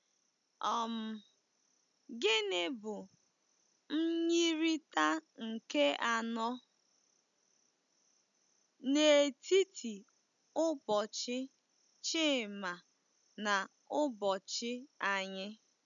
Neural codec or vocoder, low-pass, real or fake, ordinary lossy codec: none; 7.2 kHz; real; none